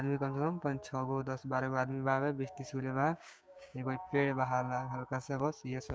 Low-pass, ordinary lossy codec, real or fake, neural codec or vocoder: none; none; fake; codec, 16 kHz, 6 kbps, DAC